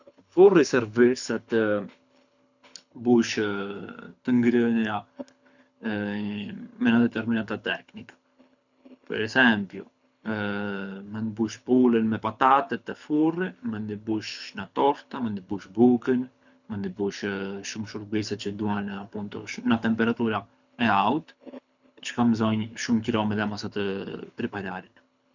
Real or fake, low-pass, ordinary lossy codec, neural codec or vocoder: fake; 7.2 kHz; none; codec, 24 kHz, 6 kbps, HILCodec